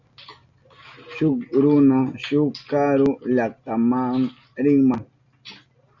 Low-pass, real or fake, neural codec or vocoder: 7.2 kHz; real; none